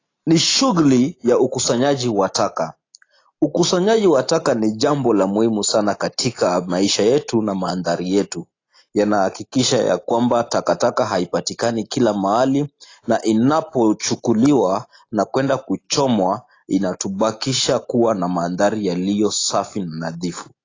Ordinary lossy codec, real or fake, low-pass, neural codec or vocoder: AAC, 32 kbps; real; 7.2 kHz; none